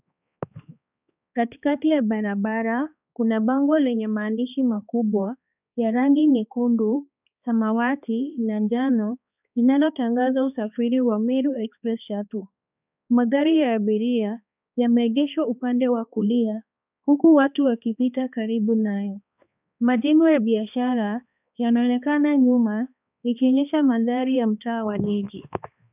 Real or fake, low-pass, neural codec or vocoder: fake; 3.6 kHz; codec, 16 kHz, 2 kbps, X-Codec, HuBERT features, trained on balanced general audio